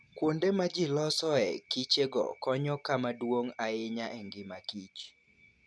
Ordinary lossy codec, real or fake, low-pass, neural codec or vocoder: none; real; none; none